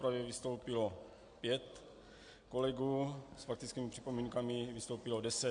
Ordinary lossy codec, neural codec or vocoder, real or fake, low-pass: MP3, 64 kbps; none; real; 9.9 kHz